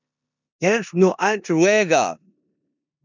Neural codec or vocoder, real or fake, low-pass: codec, 16 kHz in and 24 kHz out, 0.9 kbps, LongCat-Audio-Codec, four codebook decoder; fake; 7.2 kHz